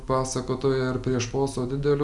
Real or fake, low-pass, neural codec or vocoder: real; 10.8 kHz; none